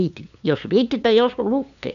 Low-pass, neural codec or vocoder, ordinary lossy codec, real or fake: 7.2 kHz; codec, 16 kHz, 2 kbps, FunCodec, trained on LibriTTS, 25 frames a second; none; fake